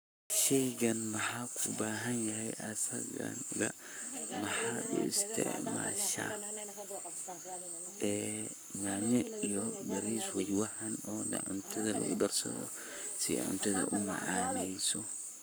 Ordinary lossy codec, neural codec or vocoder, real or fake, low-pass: none; codec, 44.1 kHz, 7.8 kbps, Pupu-Codec; fake; none